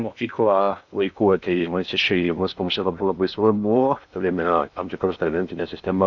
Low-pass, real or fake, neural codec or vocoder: 7.2 kHz; fake; codec, 16 kHz in and 24 kHz out, 0.6 kbps, FocalCodec, streaming, 2048 codes